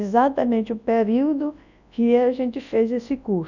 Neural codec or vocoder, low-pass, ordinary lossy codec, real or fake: codec, 24 kHz, 0.9 kbps, WavTokenizer, large speech release; 7.2 kHz; none; fake